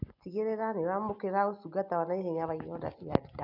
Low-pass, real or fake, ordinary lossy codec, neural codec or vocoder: 5.4 kHz; real; none; none